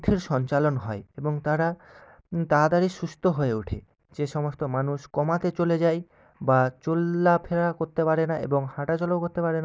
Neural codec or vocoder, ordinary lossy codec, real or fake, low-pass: none; none; real; none